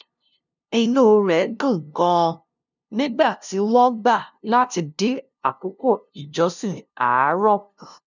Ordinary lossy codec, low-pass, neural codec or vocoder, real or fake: none; 7.2 kHz; codec, 16 kHz, 0.5 kbps, FunCodec, trained on LibriTTS, 25 frames a second; fake